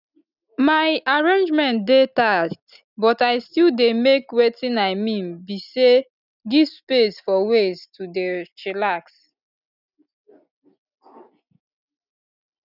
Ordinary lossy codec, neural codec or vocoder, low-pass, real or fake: none; none; 5.4 kHz; real